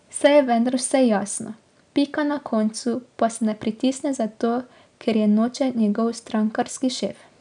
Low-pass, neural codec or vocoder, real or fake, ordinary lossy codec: 9.9 kHz; none; real; none